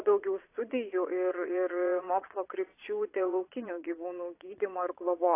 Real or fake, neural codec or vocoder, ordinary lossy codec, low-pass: fake; vocoder, 22.05 kHz, 80 mel bands, Vocos; AAC, 24 kbps; 3.6 kHz